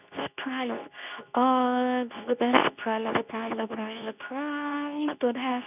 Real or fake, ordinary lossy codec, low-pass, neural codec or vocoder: fake; none; 3.6 kHz; codec, 24 kHz, 0.9 kbps, WavTokenizer, medium speech release version 2